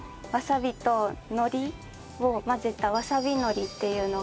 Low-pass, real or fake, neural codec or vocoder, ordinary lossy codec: none; real; none; none